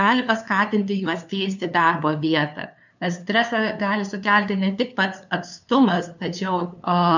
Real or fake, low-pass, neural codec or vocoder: fake; 7.2 kHz; codec, 16 kHz, 2 kbps, FunCodec, trained on LibriTTS, 25 frames a second